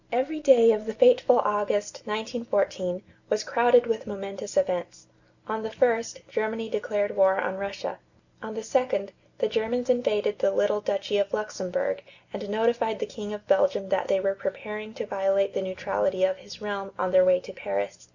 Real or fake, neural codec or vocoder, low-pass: real; none; 7.2 kHz